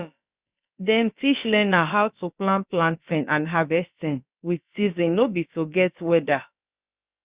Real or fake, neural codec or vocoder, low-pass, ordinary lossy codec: fake; codec, 16 kHz, about 1 kbps, DyCAST, with the encoder's durations; 3.6 kHz; Opus, 64 kbps